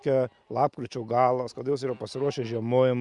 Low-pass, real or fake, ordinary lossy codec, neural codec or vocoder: 10.8 kHz; real; Opus, 64 kbps; none